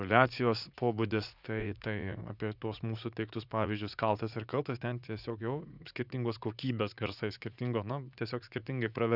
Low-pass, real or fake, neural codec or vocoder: 5.4 kHz; fake; vocoder, 44.1 kHz, 80 mel bands, Vocos